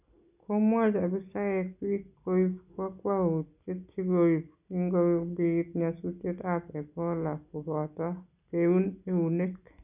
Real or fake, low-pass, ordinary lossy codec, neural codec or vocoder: real; 3.6 kHz; none; none